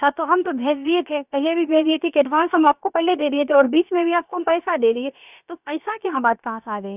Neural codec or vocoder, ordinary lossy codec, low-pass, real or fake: codec, 16 kHz, about 1 kbps, DyCAST, with the encoder's durations; none; 3.6 kHz; fake